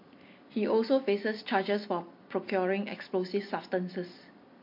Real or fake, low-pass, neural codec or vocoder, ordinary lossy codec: real; 5.4 kHz; none; MP3, 32 kbps